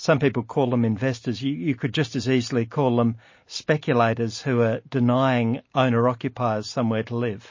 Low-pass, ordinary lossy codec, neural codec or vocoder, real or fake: 7.2 kHz; MP3, 32 kbps; none; real